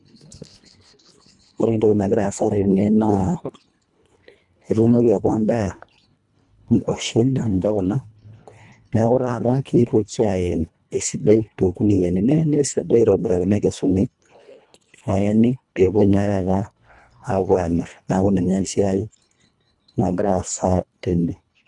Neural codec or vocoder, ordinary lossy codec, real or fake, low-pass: codec, 24 kHz, 1.5 kbps, HILCodec; none; fake; 10.8 kHz